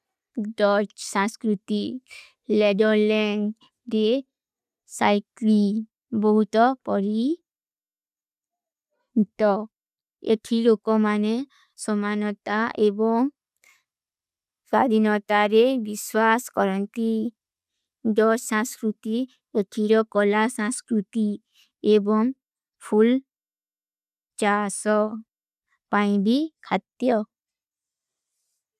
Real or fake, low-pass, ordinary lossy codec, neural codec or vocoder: real; 14.4 kHz; none; none